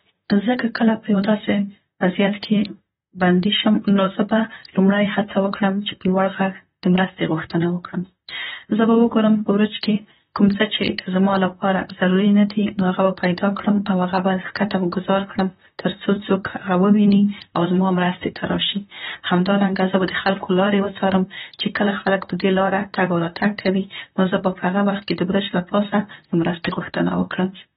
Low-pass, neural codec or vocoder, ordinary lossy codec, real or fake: 19.8 kHz; vocoder, 44.1 kHz, 128 mel bands, Pupu-Vocoder; AAC, 16 kbps; fake